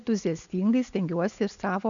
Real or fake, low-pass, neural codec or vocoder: real; 7.2 kHz; none